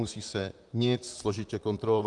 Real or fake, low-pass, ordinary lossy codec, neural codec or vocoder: fake; 10.8 kHz; Opus, 32 kbps; vocoder, 44.1 kHz, 128 mel bands, Pupu-Vocoder